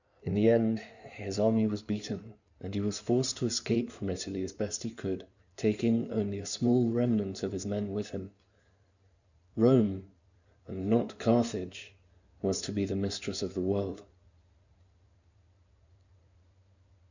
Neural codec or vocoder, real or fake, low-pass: codec, 16 kHz in and 24 kHz out, 2.2 kbps, FireRedTTS-2 codec; fake; 7.2 kHz